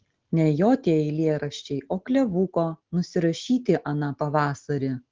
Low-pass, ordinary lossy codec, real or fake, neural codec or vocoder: 7.2 kHz; Opus, 16 kbps; real; none